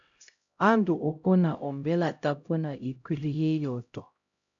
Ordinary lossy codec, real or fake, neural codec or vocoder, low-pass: MP3, 96 kbps; fake; codec, 16 kHz, 0.5 kbps, X-Codec, HuBERT features, trained on LibriSpeech; 7.2 kHz